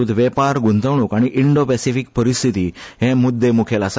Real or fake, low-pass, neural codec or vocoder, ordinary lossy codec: real; none; none; none